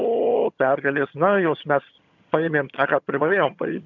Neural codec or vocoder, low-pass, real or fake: vocoder, 22.05 kHz, 80 mel bands, HiFi-GAN; 7.2 kHz; fake